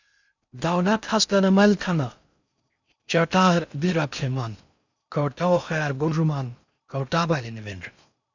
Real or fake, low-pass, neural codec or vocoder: fake; 7.2 kHz; codec, 16 kHz in and 24 kHz out, 0.6 kbps, FocalCodec, streaming, 2048 codes